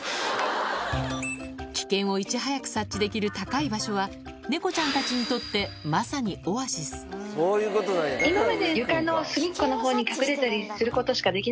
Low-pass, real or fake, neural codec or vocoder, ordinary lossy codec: none; real; none; none